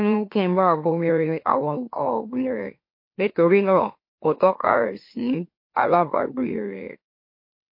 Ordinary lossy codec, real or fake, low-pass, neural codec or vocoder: MP3, 32 kbps; fake; 5.4 kHz; autoencoder, 44.1 kHz, a latent of 192 numbers a frame, MeloTTS